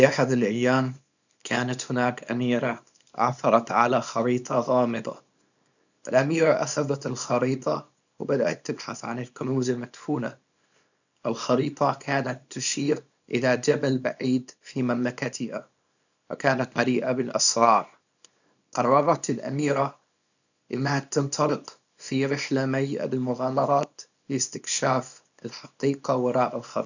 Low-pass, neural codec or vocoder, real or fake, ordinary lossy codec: 7.2 kHz; codec, 24 kHz, 0.9 kbps, WavTokenizer, small release; fake; none